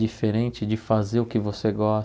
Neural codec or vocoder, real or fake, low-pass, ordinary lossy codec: none; real; none; none